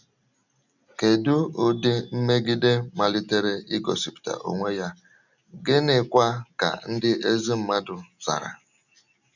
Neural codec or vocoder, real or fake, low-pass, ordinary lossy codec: none; real; 7.2 kHz; none